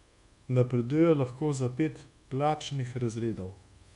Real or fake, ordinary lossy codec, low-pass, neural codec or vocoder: fake; none; 10.8 kHz; codec, 24 kHz, 1.2 kbps, DualCodec